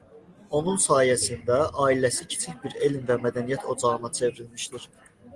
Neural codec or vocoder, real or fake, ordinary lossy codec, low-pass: none; real; Opus, 24 kbps; 10.8 kHz